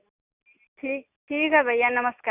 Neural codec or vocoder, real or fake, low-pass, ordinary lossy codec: none; real; 3.6 kHz; none